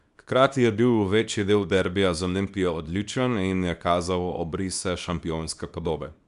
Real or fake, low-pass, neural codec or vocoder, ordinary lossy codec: fake; 10.8 kHz; codec, 24 kHz, 0.9 kbps, WavTokenizer, small release; none